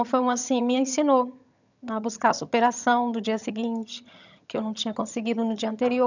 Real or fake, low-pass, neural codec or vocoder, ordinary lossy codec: fake; 7.2 kHz; vocoder, 22.05 kHz, 80 mel bands, HiFi-GAN; none